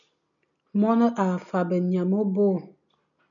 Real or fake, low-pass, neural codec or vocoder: real; 7.2 kHz; none